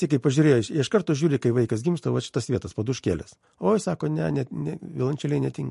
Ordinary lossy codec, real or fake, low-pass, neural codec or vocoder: MP3, 48 kbps; real; 14.4 kHz; none